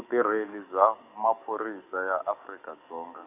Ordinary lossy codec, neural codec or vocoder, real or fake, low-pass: none; codec, 44.1 kHz, 7.8 kbps, DAC; fake; 3.6 kHz